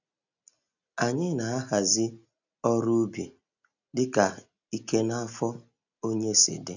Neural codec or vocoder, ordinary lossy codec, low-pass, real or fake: none; none; 7.2 kHz; real